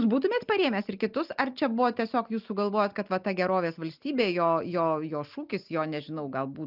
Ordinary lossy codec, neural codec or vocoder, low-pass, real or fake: Opus, 24 kbps; none; 5.4 kHz; real